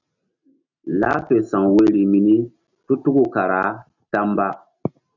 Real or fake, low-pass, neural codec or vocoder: real; 7.2 kHz; none